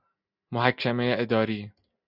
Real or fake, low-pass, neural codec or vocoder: real; 5.4 kHz; none